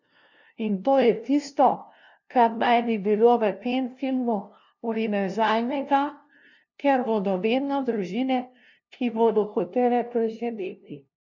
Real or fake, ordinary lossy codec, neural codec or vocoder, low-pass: fake; none; codec, 16 kHz, 0.5 kbps, FunCodec, trained on LibriTTS, 25 frames a second; 7.2 kHz